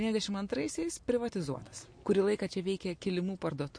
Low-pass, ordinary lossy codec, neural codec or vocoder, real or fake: 9.9 kHz; MP3, 48 kbps; none; real